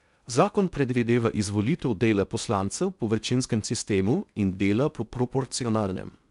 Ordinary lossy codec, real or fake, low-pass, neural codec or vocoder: none; fake; 10.8 kHz; codec, 16 kHz in and 24 kHz out, 0.6 kbps, FocalCodec, streaming, 2048 codes